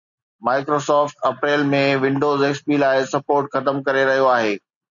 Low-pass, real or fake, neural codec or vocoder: 7.2 kHz; real; none